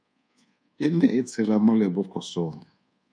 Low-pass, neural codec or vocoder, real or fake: 9.9 kHz; codec, 24 kHz, 1.2 kbps, DualCodec; fake